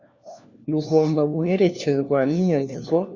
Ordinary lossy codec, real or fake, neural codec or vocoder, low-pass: Opus, 64 kbps; fake; codec, 16 kHz, 1 kbps, FunCodec, trained on LibriTTS, 50 frames a second; 7.2 kHz